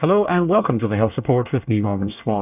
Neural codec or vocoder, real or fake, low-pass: codec, 24 kHz, 1 kbps, SNAC; fake; 3.6 kHz